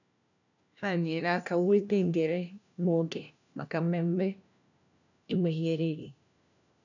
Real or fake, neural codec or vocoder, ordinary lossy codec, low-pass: fake; codec, 16 kHz, 1 kbps, FunCodec, trained on LibriTTS, 50 frames a second; none; 7.2 kHz